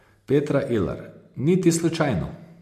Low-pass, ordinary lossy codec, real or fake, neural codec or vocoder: 14.4 kHz; MP3, 64 kbps; real; none